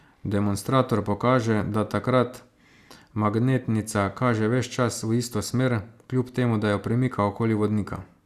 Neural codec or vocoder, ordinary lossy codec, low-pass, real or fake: none; Opus, 64 kbps; 14.4 kHz; real